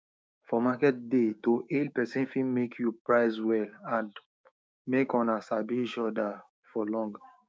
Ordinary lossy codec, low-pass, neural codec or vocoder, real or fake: none; none; codec, 16 kHz, 6 kbps, DAC; fake